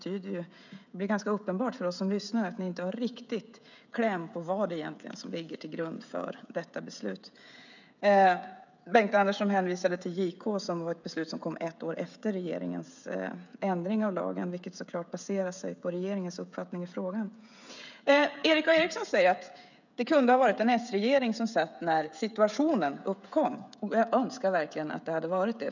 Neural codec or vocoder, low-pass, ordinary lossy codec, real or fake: codec, 16 kHz, 16 kbps, FreqCodec, smaller model; 7.2 kHz; none; fake